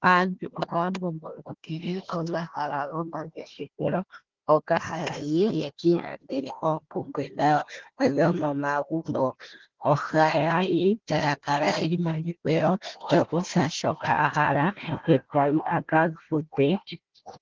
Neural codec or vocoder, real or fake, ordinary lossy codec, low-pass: codec, 16 kHz, 1 kbps, FunCodec, trained on Chinese and English, 50 frames a second; fake; Opus, 16 kbps; 7.2 kHz